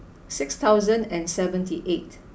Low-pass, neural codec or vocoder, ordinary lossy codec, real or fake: none; none; none; real